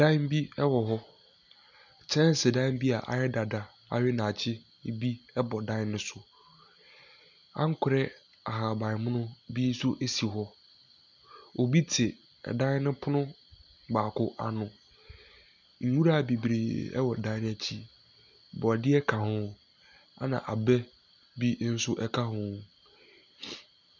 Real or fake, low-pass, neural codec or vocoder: real; 7.2 kHz; none